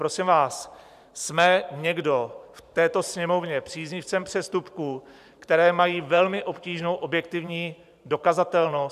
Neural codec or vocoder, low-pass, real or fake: vocoder, 44.1 kHz, 128 mel bands every 512 samples, BigVGAN v2; 14.4 kHz; fake